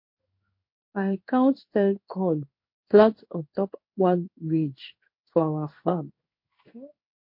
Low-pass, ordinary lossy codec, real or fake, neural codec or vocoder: 5.4 kHz; MP3, 32 kbps; fake; codec, 16 kHz in and 24 kHz out, 1 kbps, XY-Tokenizer